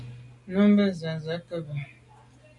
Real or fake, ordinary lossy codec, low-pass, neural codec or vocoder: real; MP3, 96 kbps; 10.8 kHz; none